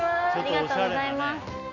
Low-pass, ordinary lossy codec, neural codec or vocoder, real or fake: 7.2 kHz; none; none; real